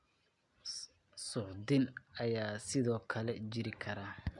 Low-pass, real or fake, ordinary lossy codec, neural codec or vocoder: 10.8 kHz; real; none; none